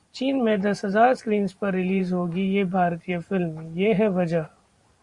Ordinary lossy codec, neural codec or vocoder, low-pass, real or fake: Opus, 64 kbps; vocoder, 44.1 kHz, 128 mel bands every 512 samples, BigVGAN v2; 10.8 kHz; fake